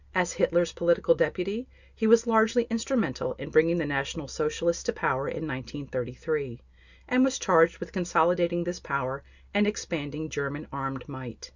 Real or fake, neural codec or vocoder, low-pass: real; none; 7.2 kHz